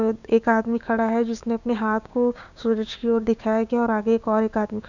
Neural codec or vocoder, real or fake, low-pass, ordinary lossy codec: codec, 16 kHz, 6 kbps, DAC; fake; 7.2 kHz; none